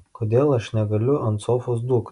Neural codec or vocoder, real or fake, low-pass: none; real; 10.8 kHz